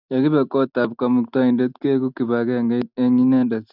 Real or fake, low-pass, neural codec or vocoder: real; 5.4 kHz; none